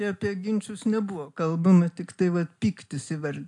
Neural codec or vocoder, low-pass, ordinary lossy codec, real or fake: none; 10.8 kHz; MP3, 64 kbps; real